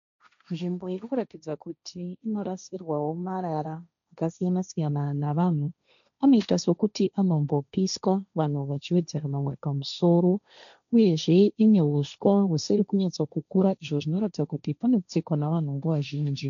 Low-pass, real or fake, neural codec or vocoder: 7.2 kHz; fake; codec, 16 kHz, 1.1 kbps, Voila-Tokenizer